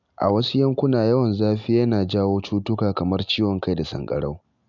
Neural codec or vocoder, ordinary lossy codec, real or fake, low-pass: none; none; real; 7.2 kHz